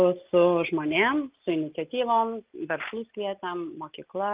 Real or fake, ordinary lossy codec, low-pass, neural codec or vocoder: real; Opus, 24 kbps; 3.6 kHz; none